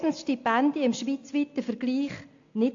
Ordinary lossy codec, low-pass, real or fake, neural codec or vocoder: AAC, 48 kbps; 7.2 kHz; real; none